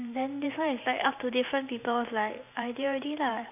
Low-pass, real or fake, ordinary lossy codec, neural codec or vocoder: 3.6 kHz; fake; none; vocoder, 44.1 kHz, 128 mel bands every 512 samples, BigVGAN v2